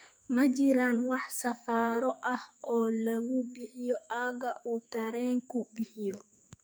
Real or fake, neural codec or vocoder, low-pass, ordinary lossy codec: fake; codec, 44.1 kHz, 2.6 kbps, SNAC; none; none